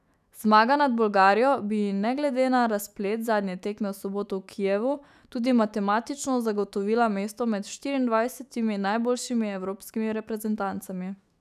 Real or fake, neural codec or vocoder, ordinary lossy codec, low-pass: fake; autoencoder, 48 kHz, 128 numbers a frame, DAC-VAE, trained on Japanese speech; none; 14.4 kHz